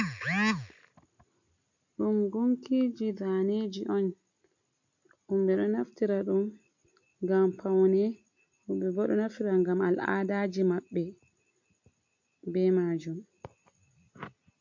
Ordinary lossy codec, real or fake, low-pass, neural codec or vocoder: MP3, 48 kbps; real; 7.2 kHz; none